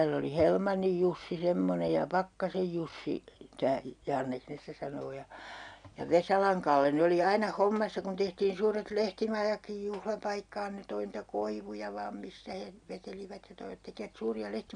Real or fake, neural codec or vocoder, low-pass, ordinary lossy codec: real; none; 9.9 kHz; none